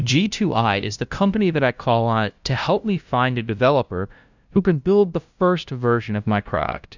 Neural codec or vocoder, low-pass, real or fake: codec, 16 kHz, 0.5 kbps, FunCodec, trained on LibriTTS, 25 frames a second; 7.2 kHz; fake